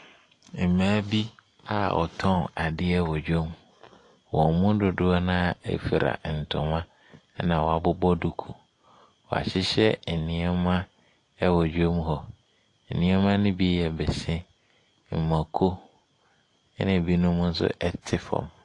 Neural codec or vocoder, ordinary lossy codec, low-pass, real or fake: none; AAC, 48 kbps; 10.8 kHz; real